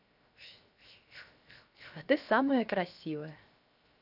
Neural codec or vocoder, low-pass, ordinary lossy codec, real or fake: codec, 16 kHz, 0.7 kbps, FocalCodec; 5.4 kHz; none; fake